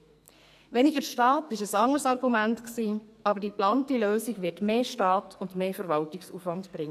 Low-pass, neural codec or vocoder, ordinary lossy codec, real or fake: 14.4 kHz; codec, 44.1 kHz, 2.6 kbps, SNAC; none; fake